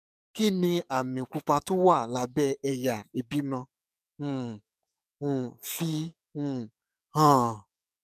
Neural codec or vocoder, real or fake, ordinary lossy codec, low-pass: codec, 44.1 kHz, 7.8 kbps, DAC; fake; none; 14.4 kHz